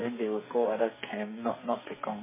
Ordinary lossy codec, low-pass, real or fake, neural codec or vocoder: MP3, 16 kbps; 3.6 kHz; fake; codec, 44.1 kHz, 2.6 kbps, SNAC